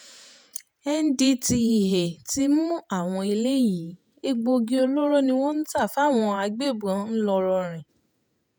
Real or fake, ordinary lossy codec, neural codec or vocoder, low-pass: fake; none; vocoder, 48 kHz, 128 mel bands, Vocos; none